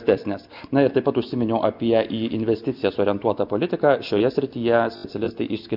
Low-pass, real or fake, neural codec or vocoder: 5.4 kHz; real; none